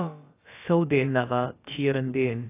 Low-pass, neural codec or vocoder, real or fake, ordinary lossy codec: 3.6 kHz; codec, 16 kHz, about 1 kbps, DyCAST, with the encoder's durations; fake; AAC, 24 kbps